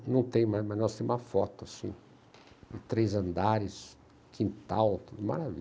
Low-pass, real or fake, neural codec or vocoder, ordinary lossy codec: none; real; none; none